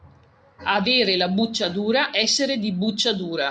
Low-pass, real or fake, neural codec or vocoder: 9.9 kHz; real; none